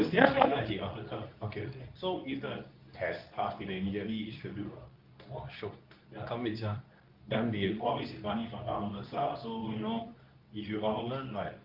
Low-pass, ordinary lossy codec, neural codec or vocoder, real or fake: 5.4 kHz; Opus, 24 kbps; codec, 24 kHz, 0.9 kbps, WavTokenizer, medium speech release version 2; fake